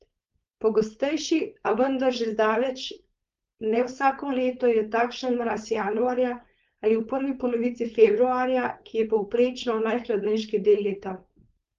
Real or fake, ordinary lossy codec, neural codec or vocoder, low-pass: fake; Opus, 32 kbps; codec, 16 kHz, 4.8 kbps, FACodec; 7.2 kHz